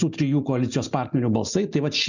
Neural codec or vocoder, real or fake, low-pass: none; real; 7.2 kHz